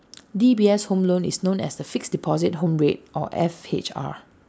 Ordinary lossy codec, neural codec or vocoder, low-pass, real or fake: none; none; none; real